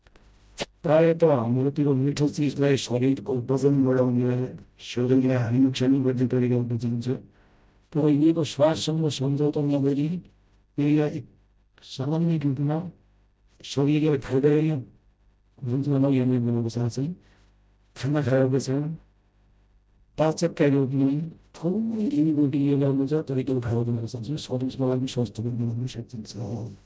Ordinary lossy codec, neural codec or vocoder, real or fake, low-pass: none; codec, 16 kHz, 0.5 kbps, FreqCodec, smaller model; fake; none